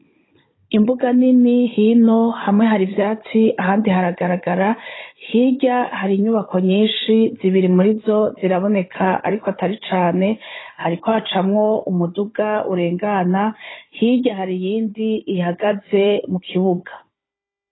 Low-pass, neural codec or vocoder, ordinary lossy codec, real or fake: 7.2 kHz; codec, 16 kHz, 16 kbps, FunCodec, trained on Chinese and English, 50 frames a second; AAC, 16 kbps; fake